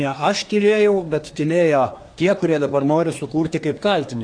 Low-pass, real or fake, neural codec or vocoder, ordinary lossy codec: 9.9 kHz; fake; codec, 24 kHz, 1 kbps, SNAC; AAC, 48 kbps